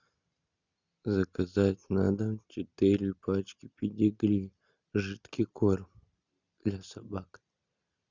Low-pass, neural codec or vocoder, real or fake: 7.2 kHz; none; real